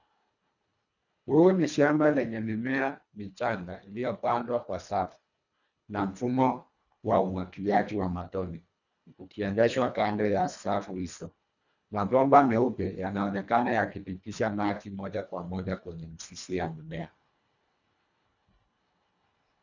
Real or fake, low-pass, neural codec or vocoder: fake; 7.2 kHz; codec, 24 kHz, 1.5 kbps, HILCodec